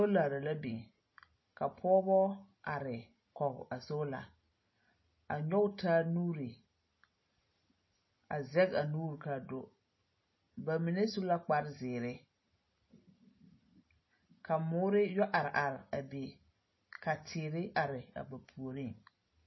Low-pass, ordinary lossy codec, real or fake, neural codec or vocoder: 7.2 kHz; MP3, 24 kbps; real; none